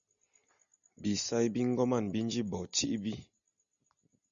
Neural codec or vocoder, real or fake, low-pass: none; real; 7.2 kHz